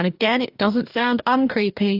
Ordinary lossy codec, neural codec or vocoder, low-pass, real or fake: AAC, 48 kbps; codec, 44.1 kHz, 2.6 kbps, DAC; 5.4 kHz; fake